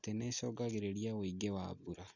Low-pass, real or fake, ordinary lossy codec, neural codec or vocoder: 7.2 kHz; real; none; none